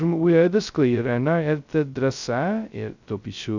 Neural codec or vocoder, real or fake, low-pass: codec, 16 kHz, 0.2 kbps, FocalCodec; fake; 7.2 kHz